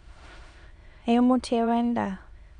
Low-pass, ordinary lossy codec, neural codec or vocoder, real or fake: 9.9 kHz; none; autoencoder, 22.05 kHz, a latent of 192 numbers a frame, VITS, trained on many speakers; fake